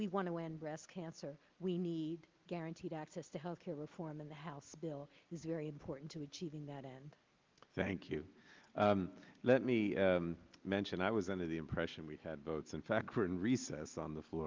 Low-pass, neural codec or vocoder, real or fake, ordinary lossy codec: 7.2 kHz; none; real; Opus, 32 kbps